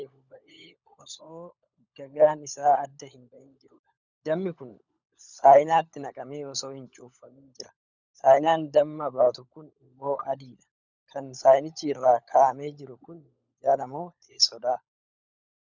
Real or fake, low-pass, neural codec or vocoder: fake; 7.2 kHz; codec, 16 kHz, 16 kbps, FunCodec, trained on LibriTTS, 50 frames a second